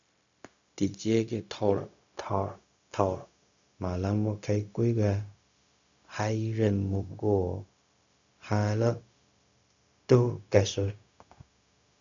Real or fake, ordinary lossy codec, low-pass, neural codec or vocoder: fake; MP3, 64 kbps; 7.2 kHz; codec, 16 kHz, 0.4 kbps, LongCat-Audio-Codec